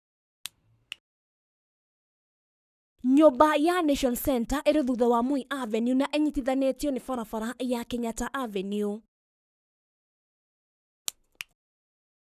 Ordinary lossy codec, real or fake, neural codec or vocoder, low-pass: none; fake; codec, 44.1 kHz, 7.8 kbps, Pupu-Codec; 14.4 kHz